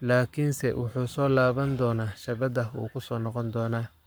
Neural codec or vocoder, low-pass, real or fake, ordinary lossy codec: codec, 44.1 kHz, 7.8 kbps, Pupu-Codec; none; fake; none